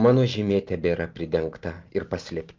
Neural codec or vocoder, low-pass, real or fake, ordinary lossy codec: none; 7.2 kHz; real; Opus, 32 kbps